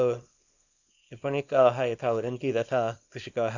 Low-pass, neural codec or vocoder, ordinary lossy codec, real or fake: 7.2 kHz; codec, 24 kHz, 0.9 kbps, WavTokenizer, small release; none; fake